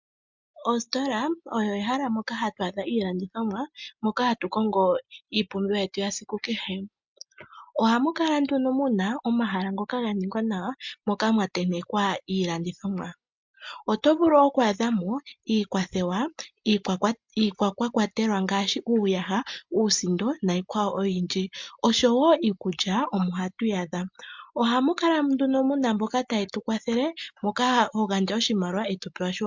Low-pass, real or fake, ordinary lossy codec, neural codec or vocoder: 7.2 kHz; real; MP3, 64 kbps; none